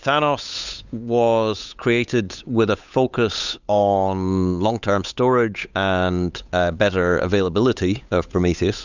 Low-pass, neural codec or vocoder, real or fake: 7.2 kHz; codec, 16 kHz, 8 kbps, FunCodec, trained on Chinese and English, 25 frames a second; fake